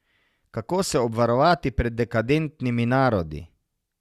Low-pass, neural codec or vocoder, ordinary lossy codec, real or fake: 14.4 kHz; none; Opus, 64 kbps; real